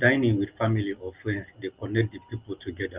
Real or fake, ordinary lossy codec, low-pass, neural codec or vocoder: real; Opus, 32 kbps; 3.6 kHz; none